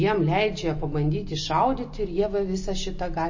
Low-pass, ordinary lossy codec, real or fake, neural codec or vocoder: 7.2 kHz; MP3, 32 kbps; real; none